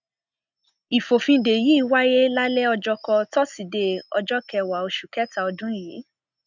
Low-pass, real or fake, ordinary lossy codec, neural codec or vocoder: 7.2 kHz; real; none; none